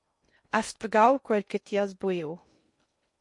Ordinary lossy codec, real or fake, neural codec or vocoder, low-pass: MP3, 48 kbps; fake; codec, 16 kHz in and 24 kHz out, 0.6 kbps, FocalCodec, streaming, 2048 codes; 10.8 kHz